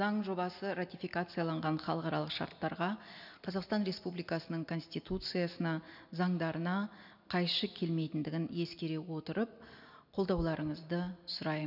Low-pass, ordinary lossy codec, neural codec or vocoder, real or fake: 5.4 kHz; none; none; real